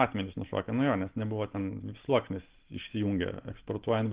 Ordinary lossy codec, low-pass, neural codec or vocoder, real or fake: Opus, 16 kbps; 3.6 kHz; none; real